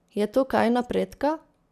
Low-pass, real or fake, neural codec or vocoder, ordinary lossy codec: 14.4 kHz; real; none; none